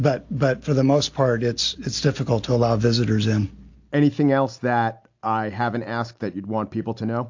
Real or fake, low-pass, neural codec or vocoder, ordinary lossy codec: real; 7.2 kHz; none; AAC, 48 kbps